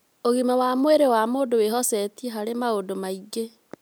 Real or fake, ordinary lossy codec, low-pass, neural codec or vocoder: real; none; none; none